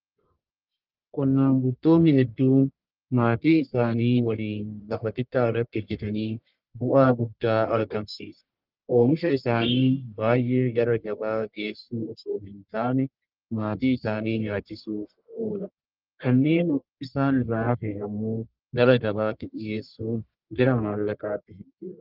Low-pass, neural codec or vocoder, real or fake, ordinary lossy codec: 5.4 kHz; codec, 44.1 kHz, 1.7 kbps, Pupu-Codec; fake; Opus, 32 kbps